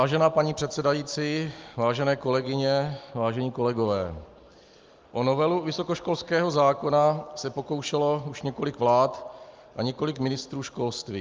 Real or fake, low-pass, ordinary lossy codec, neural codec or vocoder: real; 7.2 kHz; Opus, 24 kbps; none